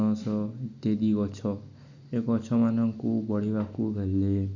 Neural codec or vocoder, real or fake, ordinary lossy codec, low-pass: none; real; none; 7.2 kHz